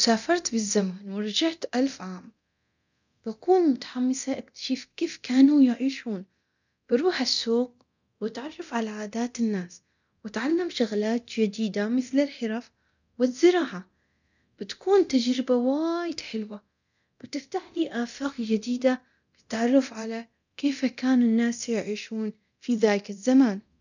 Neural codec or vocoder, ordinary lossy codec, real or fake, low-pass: codec, 24 kHz, 0.9 kbps, DualCodec; none; fake; 7.2 kHz